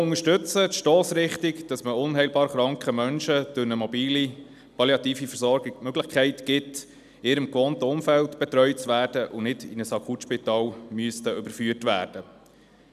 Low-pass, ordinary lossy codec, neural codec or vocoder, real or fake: 14.4 kHz; none; none; real